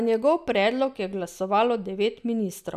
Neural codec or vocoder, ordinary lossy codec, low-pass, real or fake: none; none; 14.4 kHz; real